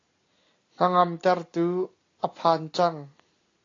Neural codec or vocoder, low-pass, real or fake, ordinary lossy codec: none; 7.2 kHz; real; AAC, 32 kbps